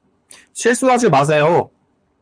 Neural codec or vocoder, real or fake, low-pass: codec, 24 kHz, 6 kbps, HILCodec; fake; 9.9 kHz